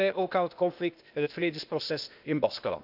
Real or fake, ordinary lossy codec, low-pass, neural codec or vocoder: fake; none; 5.4 kHz; codec, 16 kHz, 0.8 kbps, ZipCodec